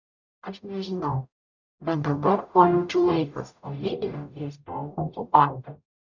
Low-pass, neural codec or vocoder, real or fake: 7.2 kHz; codec, 44.1 kHz, 0.9 kbps, DAC; fake